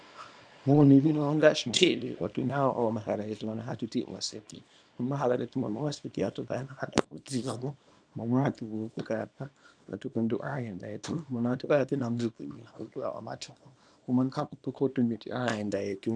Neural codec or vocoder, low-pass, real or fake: codec, 24 kHz, 0.9 kbps, WavTokenizer, small release; 9.9 kHz; fake